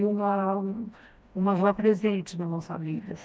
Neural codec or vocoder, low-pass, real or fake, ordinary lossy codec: codec, 16 kHz, 1 kbps, FreqCodec, smaller model; none; fake; none